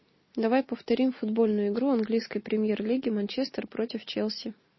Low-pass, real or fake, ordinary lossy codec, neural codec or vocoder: 7.2 kHz; real; MP3, 24 kbps; none